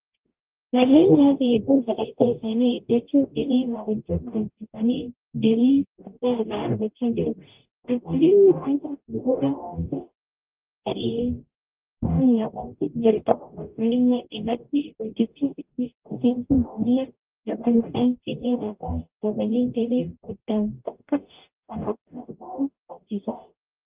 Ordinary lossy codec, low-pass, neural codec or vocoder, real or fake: Opus, 24 kbps; 3.6 kHz; codec, 44.1 kHz, 0.9 kbps, DAC; fake